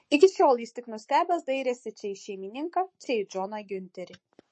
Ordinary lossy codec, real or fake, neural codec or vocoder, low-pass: MP3, 32 kbps; fake; codec, 44.1 kHz, 7.8 kbps, Pupu-Codec; 10.8 kHz